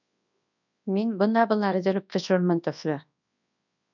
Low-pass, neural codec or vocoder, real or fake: 7.2 kHz; codec, 24 kHz, 0.9 kbps, WavTokenizer, large speech release; fake